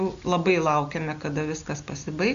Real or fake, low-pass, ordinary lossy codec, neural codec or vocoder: real; 7.2 kHz; AAC, 64 kbps; none